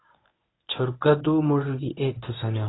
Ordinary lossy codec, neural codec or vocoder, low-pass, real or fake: AAC, 16 kbps; codec, 24 kHz, 0.9 kbps, WavTokenizer, medium speech release version 1; 7.2 kHz; fake